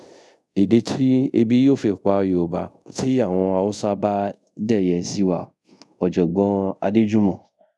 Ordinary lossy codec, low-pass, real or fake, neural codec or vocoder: none; none; fake; codec, 24 kHz, 0.5 kbps, DualCodec